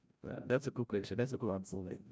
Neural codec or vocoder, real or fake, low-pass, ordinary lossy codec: codec, 16 kHz, 0.5 kbps, FreqCodec, larger model; fake; none; none